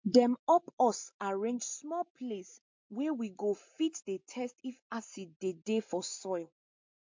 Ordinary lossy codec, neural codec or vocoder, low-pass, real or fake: MP3, 48 kbps; none; 7.2 kHz; real